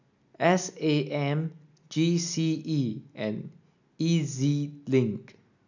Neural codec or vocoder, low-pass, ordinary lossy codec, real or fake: none; 7.2 kHz; none; real